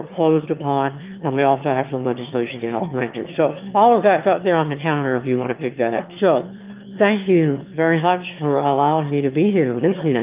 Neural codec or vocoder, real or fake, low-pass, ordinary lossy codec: autoencoder, 22.05 kHz, a latent of 192 numbers a frame, VITS, trained on one speaker; fake; 3.6 kHz; Opus, 24 kbps